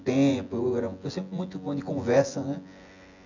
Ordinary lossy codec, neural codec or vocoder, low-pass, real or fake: none; vocoder, 24 kHz, 100 mel bands, Vocos; 7.2 kHz; fake